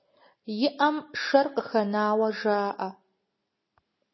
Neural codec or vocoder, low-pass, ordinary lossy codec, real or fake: none; 7.2 kHz; MP3, 24 kbps; real